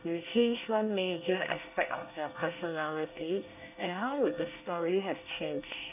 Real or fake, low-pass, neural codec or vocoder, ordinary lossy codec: fake; 3.6 kHz; codec, 24 kHz, 1 kbps, SNAC; none